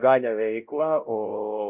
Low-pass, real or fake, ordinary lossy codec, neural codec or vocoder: 3.6 kHz; fake; Opus, 24 kbps; codec, 16 kHz, 0.5 kbps, X-Codec, WavLM features, trained on Multilingual LibriSpeech